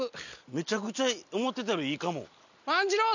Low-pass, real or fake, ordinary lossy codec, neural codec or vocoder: 7.2 kHz; real; none; none